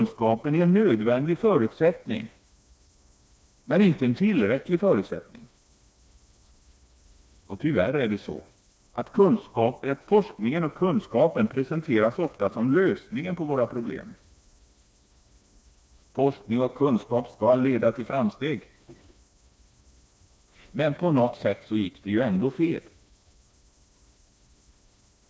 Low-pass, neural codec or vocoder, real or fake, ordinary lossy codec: none; codec, 16 kHz, 2 kbps, FreqCodec, smaller model; fake; none